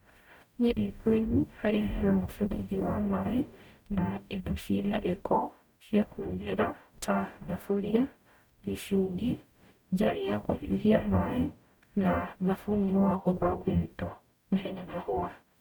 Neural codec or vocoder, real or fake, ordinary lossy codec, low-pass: codec, 44.1 kHz, 0.9 kbps, DAC; fake; none; 19.8 kHz